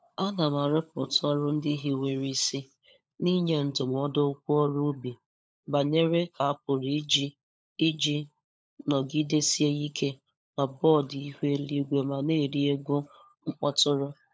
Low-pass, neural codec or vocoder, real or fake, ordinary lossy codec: none; codec, 16 kHz, 16 kbps, FunCodec, trained on LibriTTS, 50 frames a second; fake; none